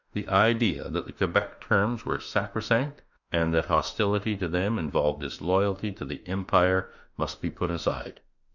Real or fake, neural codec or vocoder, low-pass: fake; autoencoder, 48 kHz, 32 numbers a frame, DAC-VAE, trained on Japanese speech; 7.2 kHz